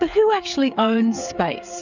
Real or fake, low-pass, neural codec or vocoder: fake; 7.2 kHz; codec, 16 kHz, 8 kbps, FreqCodec, smaller model